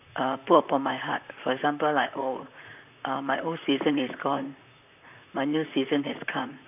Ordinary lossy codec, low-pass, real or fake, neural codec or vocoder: none; 3.6 kHz; fake; vocoder, 44.1 kHz, 128 mel bands, Pupu-Vocoder